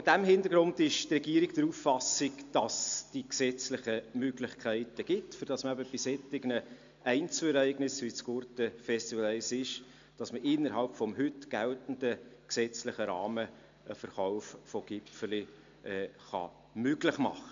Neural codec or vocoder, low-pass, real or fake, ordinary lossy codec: none; 7.2 kHz; real; AAC, 64 kbps